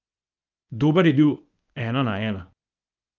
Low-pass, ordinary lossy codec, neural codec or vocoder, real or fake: 7.2 kHz; Opus, 24 kbps; codec, 24 kHz, 0.9 kbps, WavTokenizer, medium speech release version 1; fake